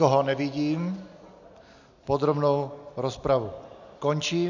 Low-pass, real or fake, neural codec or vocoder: 7.2 kHz; real; none